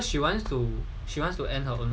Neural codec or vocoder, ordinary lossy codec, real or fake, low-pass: none; none; real; none